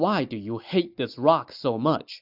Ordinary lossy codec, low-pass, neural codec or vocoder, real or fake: AAC, 48 kbps; 5.4 kHz; none; real